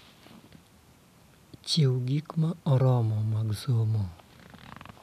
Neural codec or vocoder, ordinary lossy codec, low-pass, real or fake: none; none; 14.4 kHz; real